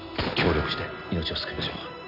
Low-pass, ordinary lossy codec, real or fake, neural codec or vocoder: 5.4 kHz; none; real; none